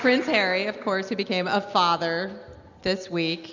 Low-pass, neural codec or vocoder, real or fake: 7.2 kHz; none; real